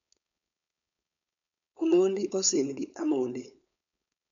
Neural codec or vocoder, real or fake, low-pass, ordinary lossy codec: codec, 16 kHz, 4.8 kbps, FACodec; fake; 7.2 kHz; none